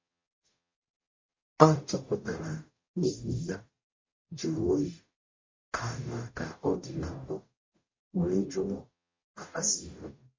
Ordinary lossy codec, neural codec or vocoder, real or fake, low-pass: MP3, 32 kbps; codec, 44.1 kHz, 0.9 kbps, DAC; fake; 7.2 kHz